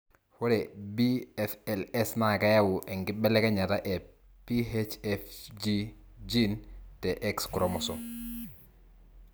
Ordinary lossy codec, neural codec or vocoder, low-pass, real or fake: none; none; none; real